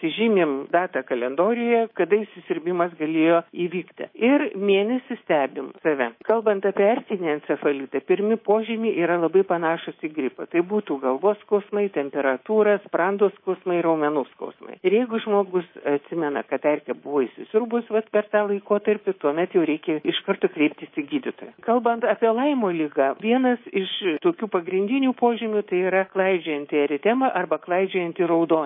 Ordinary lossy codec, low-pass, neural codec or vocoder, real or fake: MP3, 24 kbps; 5.4 kHz; codec, 24 kHz, 3.1 kbps, DualCodec; fake